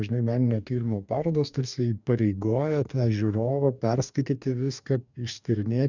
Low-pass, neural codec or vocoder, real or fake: 7.2 kHz; codec, 44.1 kHz, 2.6 kbps, DAC; fake